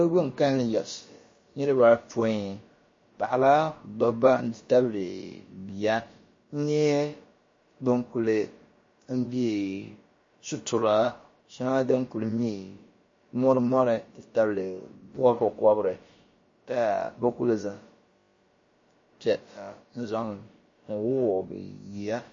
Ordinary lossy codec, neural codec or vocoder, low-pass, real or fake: MP3, 32 kbps; codec, 16 kHz, about 1 kbps, DyCAST, with the encoder's durations; 7.2 kHz; fake